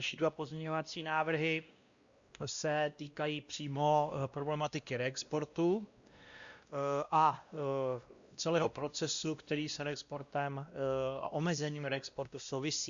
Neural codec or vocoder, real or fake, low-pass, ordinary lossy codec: codec, 16 kHz, 1 kbps, X-Codec, WavLM features, trained on Multilingual LibriSpeech; fake; 7.2 kHz; Opus, 64 kbps